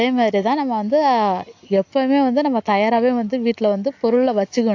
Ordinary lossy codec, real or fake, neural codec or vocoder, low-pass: AAC, 48 kbps; real; none; 7.2 kHz